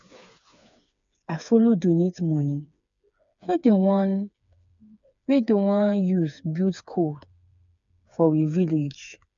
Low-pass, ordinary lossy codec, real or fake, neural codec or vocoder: 7.2 kHz; MP3, 64 kbps; fake; codec, 16 kHz, 4 kbps, FreqCodec, smaller model